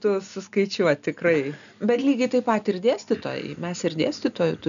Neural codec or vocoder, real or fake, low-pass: none; real; 7.2 kHz